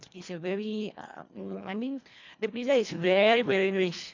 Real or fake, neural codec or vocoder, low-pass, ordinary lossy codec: fake; codec, 24 kHz, 1.5 kbps, HILCodec; 7.2 kHz; MP3, 64 kbps